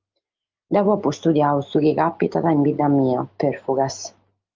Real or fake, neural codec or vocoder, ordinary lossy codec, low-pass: real; none; Opus, 24 kbps; 7.2 kHz